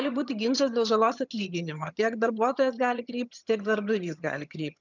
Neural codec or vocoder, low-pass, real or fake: vocoder, 22.05 kHz, 80 mel bands, HiFi-GAN; 7.2 kHz; fake